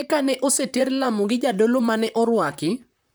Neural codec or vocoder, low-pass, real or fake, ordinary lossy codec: vocoder, 44.1 kHz, 128 mel bands, Pupu-Vocoder; none; fake; none